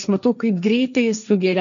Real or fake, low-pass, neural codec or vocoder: fake; 7.2 kHz; codec, 16 kHz, 1.1 kbps, Voila-Tokenizer